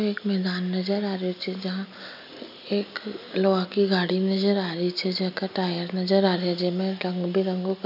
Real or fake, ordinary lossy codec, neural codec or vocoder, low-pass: real; none; none; 5.4 kHz